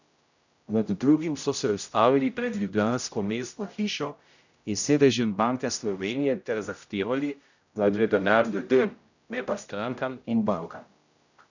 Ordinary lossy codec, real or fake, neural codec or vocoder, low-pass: none; fake; codec, 16 kHz, 0.5 kbps, X-Codec, HuBERT features, trained on general audio; 7.2 kHz